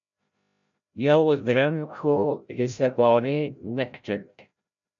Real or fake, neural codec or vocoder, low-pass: fake; codec, 16 kHz, 0.5 kbps, FreqCodec, larger model; 7.2 kHz